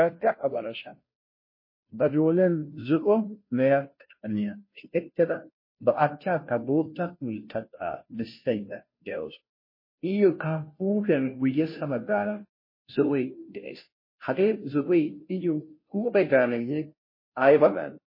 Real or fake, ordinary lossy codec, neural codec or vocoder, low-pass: fake; MP3, 24 kbps; codec, 16 kHz, 0.5 kbps, FunCodec, trained on Chinese and English, 25 frames a second; 5.4 kHz